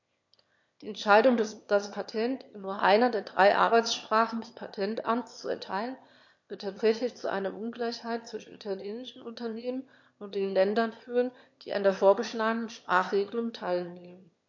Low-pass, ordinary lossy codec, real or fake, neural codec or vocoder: 7.2 kHz; MP3, 48 kbps; fake; autoencoder, 22.05 kHz, a latent of 192 numbers a frame, VITS, trained on one speaker